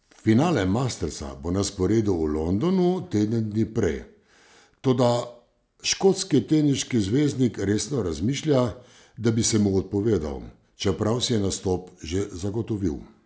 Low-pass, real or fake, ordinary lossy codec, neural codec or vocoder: none; real; none; none